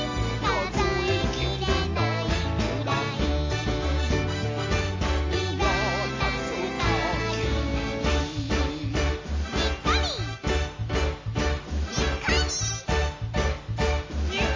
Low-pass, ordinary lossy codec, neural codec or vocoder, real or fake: 7.2 kHz; MP3, 32 kbps; none; real